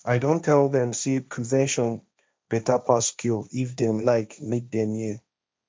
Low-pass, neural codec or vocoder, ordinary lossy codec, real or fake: none; codec, 16 kHz, 1.1 kbps, Voila-Tokenizer; none; fake